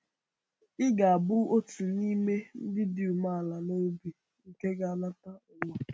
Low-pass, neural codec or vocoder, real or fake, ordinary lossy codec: none; none; real; none